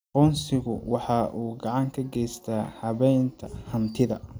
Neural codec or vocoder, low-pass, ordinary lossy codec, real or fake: none; none; none; real